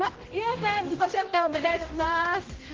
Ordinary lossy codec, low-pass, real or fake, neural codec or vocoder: Opus, 16 kbps; 7.2 kHz; fake; codec, 16 kHz, 0.5 kbps, X-Codec, HuBERT features, trained on general audio